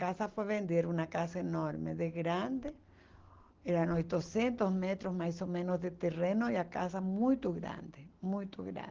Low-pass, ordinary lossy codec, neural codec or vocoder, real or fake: 7.2 kHz; Opus, 16 kbps; none; real